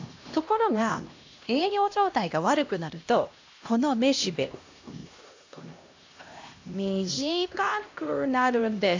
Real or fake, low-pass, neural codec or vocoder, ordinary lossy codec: fake; 7.2 kHz; codec, 16 kHz, 1 kbps, X-Codec, HuBERT features, trained on LibriSpeech; AAC, 48 kbps